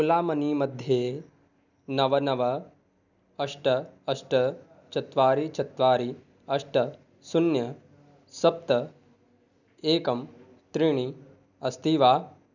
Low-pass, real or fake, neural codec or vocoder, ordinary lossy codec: 7.2 kHz; real; none; none